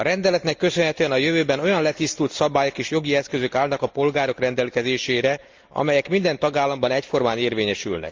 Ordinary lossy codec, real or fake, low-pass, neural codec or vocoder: Opus, 32 kbps; real; 7.2 kHz; none